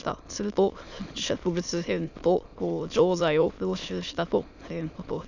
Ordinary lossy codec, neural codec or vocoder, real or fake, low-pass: none; autoencoder, 22.05 kHz, a latent of 192 numbers a frame, VITS, trained on many speakers; fake; 7.2 kHz